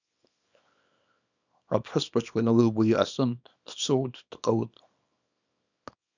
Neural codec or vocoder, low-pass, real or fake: codec, 24 kHz, 0.9 kbps, WavTokenizer, small release; 7.2 kHz; fake